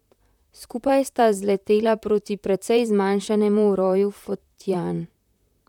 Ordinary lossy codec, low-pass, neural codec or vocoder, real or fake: none; 19.8 kHz; vocoder, 44.1 kHz, 128 mel bands, Pupu-Vocoder; fake